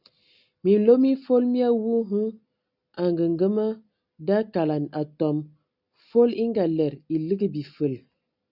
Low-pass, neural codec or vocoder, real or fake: 5.4 kHz; none; real